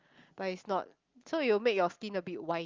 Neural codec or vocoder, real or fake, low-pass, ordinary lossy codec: none; real; 7.2 kHz; Opus, 32 kbps